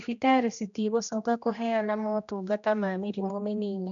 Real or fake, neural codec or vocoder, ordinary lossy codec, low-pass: fake; codec, 16 kHz, 1 kbps, X-Codec, HuBERT features, trained on general audio; none; 7.2 kHz